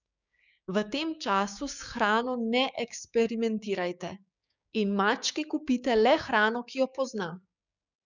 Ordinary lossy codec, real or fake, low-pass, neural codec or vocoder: none; fake; 7.2 kHz; codec, 16 kHz, 6 kbps, DAC